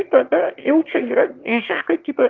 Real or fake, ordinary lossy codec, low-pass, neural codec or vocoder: fake; Opus, 24 kbps; 7.2 kHz; autoencoder, 22.05 kHz, a latent of 192 numbers a frame, VITS, trained on one speaker